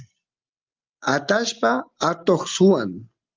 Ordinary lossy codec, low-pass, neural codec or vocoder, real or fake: Opus, 24 kbps; 7.2 kHz; none; real